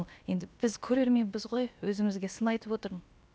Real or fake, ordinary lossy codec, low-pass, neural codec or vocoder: fake; none; none; codec, 16 kHz, about 1 kbps, DyCAST, with the encoder's durations